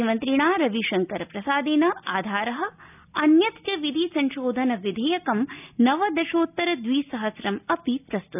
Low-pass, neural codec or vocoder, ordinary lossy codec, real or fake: 3.6 kHz; none; none; real